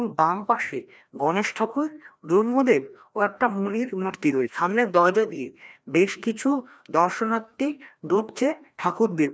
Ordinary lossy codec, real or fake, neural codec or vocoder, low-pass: none; fake; codec, 16 kHz, 1 kbps, FreqCodec, larger model; none